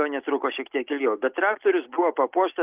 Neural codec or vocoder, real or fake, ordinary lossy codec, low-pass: none; real; Opus, 24 kbps; 3.6 kHz